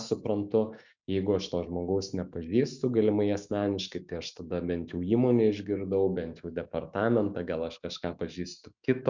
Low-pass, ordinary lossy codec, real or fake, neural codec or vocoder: 7.2 kHz; Opus, 64 kbps; fake; autoencoder, 48 kHz, 128 numbers a frame, DAC-VAE, trained on Japanese speech